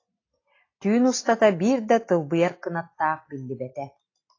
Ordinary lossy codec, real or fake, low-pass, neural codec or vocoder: AAC, 32 kbps; real; 7.2 kHz; none